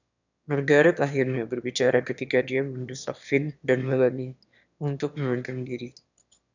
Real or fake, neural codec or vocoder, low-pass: fake; autoencoder, 22.05 kHz, a latent of 192 numbers a frame, VITS, trained on one speaker; 7.2 kHz